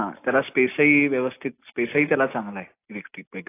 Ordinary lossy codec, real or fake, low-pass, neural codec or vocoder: AAC, 24 kbps; real; 3.6 kHz; none